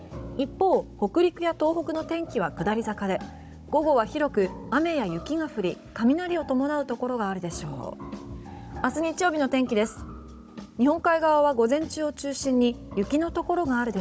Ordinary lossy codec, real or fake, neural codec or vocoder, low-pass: none; fake; codec, 16 kHz, 16 kbps, FunCodec, trained on Chinese and English, 50 frames a second; none